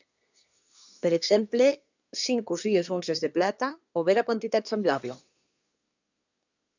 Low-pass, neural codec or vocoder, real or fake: 7.2 kHz; codec, 24 kHz, 1 kbps, SNAC; fake